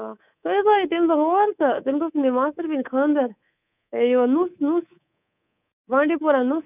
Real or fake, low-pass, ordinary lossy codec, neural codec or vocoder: fake; 3.6 kHz; none; codec, 24 kHz, 3.1 kbps, DualCodec